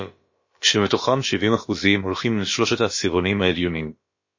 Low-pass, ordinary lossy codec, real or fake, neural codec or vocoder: 7.2 kHz; MP3, 32 kbps; fake; codec, 16 kHz, about 1 kbps, DyCAST, with the encoder's durations